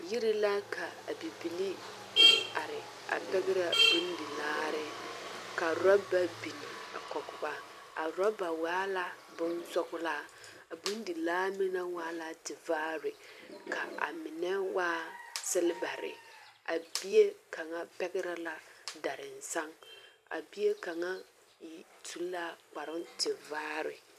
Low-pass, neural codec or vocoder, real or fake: 14.4 kHz; vocoder, 44.1 kHz, 128 mel bands every 256 samples, BigVGAN v2; fake